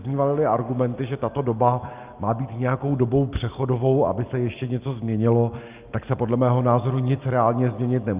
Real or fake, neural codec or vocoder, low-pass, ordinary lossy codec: real; none; 3.6 kHz; Opus, 32 kbps